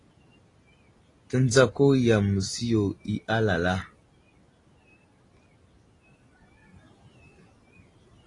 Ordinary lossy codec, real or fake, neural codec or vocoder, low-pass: AAC, 32 kbps; real; none; 10.8 kHz